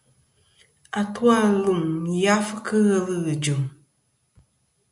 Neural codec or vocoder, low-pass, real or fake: none; 10.8 kHz; real